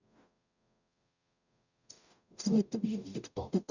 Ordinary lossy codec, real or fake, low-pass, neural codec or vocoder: none; fake; 7.2 kHz; codec, 44.1 kHz, 0.9 kbps, DAC